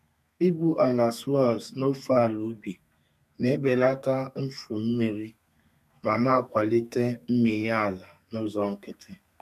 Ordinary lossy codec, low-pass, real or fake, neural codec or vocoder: none; 14.4 kHz; fake; codec, 32 kHz, 1.9 kbps, SNAC